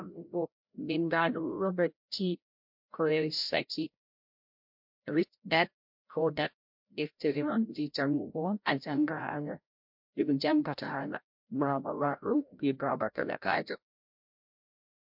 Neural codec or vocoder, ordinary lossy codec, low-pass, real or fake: codec, 16 kHz, 0.5 kbps, FreqCodec, larger model; MP3, 48 kbps; 5.4 kHz; fake